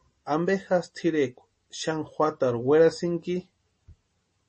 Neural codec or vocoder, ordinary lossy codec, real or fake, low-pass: none; MP3, 32 kbps; real; 10.8 kHz